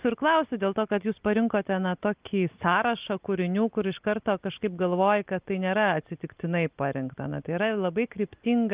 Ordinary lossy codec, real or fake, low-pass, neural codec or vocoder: Opus, 32 kbps; real; 3.6 kHz; none